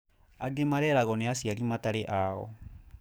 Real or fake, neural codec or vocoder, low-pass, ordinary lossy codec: fake; codec, 44.1 kHz, 7.8 kbps, DAC; none; none